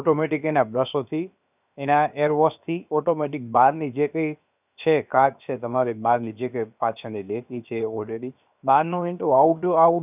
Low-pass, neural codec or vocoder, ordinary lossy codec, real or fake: 3.6 kHz; codec, 16 kHz, 0.7 kbps, FocalCodec; none; fake